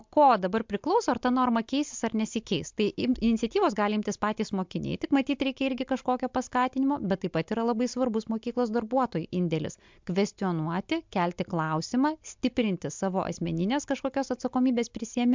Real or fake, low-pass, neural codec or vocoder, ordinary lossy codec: real; 7.2 kHz; none; MP3, 64 kbps